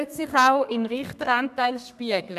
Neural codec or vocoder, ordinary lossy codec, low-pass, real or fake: codec, 32 kHz, 1.9 kbps, SNAC; none; 14.4 kHz; fake